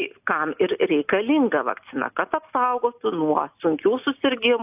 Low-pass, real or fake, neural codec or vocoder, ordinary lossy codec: 3.6 kHz; real; none; AAC, 32 kbps